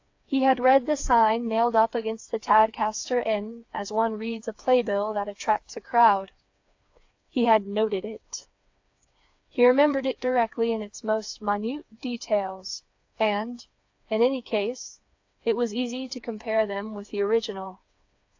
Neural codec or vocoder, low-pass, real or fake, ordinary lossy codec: codec, 16 kHz, 4 kbps, FreqCodec, smaller model; 7.2 kHz; fake; AAC, 48 kbps